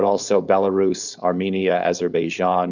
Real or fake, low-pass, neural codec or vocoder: fake; 7.2 kHz; codec, 16 kHz, 4.8 kbps, FACodec